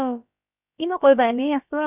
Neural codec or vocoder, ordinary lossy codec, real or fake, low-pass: codec, 16 kHz, about 1 kbps, DyCAST, with the encoder's durations; none; fake; 3.6 kHz